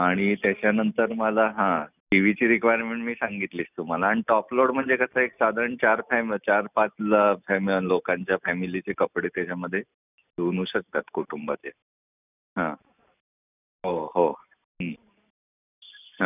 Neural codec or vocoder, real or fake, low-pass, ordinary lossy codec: none; real; 3.6 kHz; none